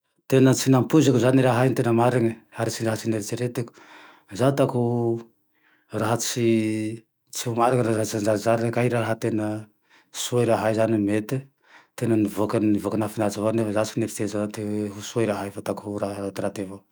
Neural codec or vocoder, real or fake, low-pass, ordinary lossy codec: autoencoder, 48 kHz, 128 numbers a frame, DAC-VAE, trained on Japanese speech; fake; none; none